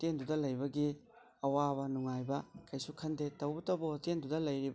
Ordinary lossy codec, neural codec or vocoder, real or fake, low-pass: none; none; real; none